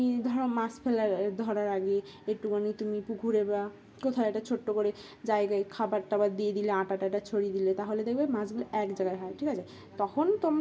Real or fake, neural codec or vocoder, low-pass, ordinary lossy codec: real; none; none; none